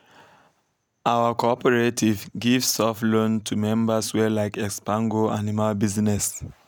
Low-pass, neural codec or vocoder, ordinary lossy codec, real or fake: none; none; none; real